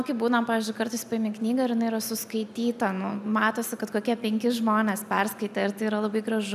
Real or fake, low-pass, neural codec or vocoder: real; 14.4 kHz; none